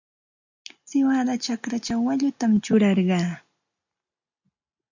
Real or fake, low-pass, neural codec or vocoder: real; 7.2 kHz; none